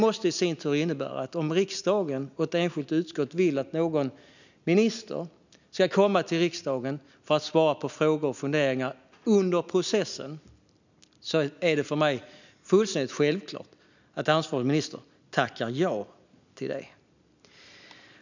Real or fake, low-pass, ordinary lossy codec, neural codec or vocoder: real; 7.2 kHz; none; none